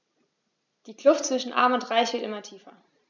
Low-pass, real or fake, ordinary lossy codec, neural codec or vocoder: 7.2 kHz; real; none; none